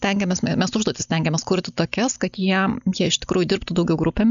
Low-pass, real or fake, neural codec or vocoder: 7.2 kHz; real; none